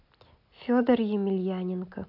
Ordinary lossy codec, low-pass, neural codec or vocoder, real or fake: AAC, 48 kbps; 5.4 kHz; none; real